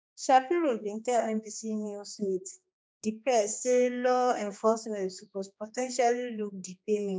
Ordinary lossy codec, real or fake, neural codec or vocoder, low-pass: none; fake; codec, 16 kHz, 2 kbps, X-Codec, HuBERT features, trained on general audio; none